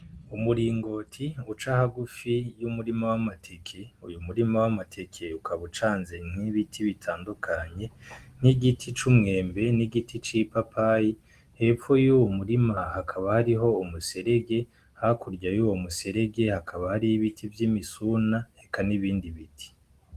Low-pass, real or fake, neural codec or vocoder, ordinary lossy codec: 14.4 kHz; real; none; Opus, 24 kbps